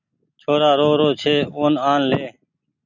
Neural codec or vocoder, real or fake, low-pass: none; real; 7.2 kHz